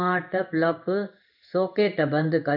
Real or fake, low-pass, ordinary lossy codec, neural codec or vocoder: fake; 5.4 kHz; none; codec, 16 kHz in and 24 kHz out, 1 kbps, XY-Tokenizer